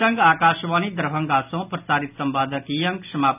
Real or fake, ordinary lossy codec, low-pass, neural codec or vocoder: real; none; 3.6 kHz; none